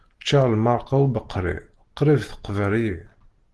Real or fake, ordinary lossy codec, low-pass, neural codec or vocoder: real; Opus, 16 kbps; 10.8 kHz; none